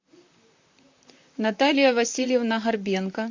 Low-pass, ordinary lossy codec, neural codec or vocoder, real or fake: 7.2 kHz; MP3, 48 kbps; vocoder, 44.1 kHz, 128 mel bands, Pupu-Vocoder; fake